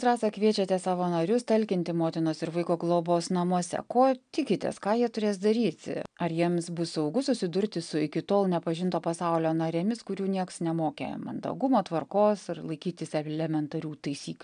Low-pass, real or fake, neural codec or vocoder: 9.9 kHz; real; none